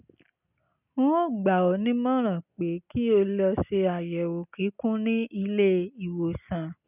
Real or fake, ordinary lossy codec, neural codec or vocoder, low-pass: real; none; none; 3.6 kHz